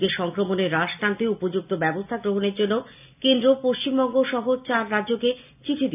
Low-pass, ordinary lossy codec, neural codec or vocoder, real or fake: 3.6 kHz; none; none; real